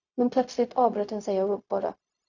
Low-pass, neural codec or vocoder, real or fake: 7.2 kHz; codec, 16 kHz, 0.4 kbps, LongCat-Audio-Codec; fake